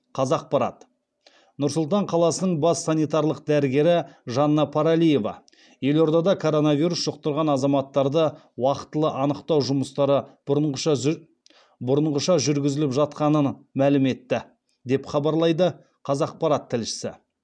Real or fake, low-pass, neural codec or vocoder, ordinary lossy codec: real; 9.9 kHz; none; none